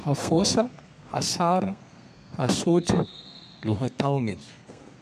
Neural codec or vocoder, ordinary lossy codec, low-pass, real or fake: codec, 32 kHz, 1.9 kbps, SNAC; none; 14.4 kHz; fake